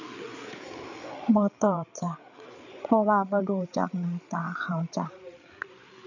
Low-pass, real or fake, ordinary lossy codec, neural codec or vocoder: 7.2 kHz; fake; none; codec, 16 kHz, 8 kbps, FreqCodec, larger model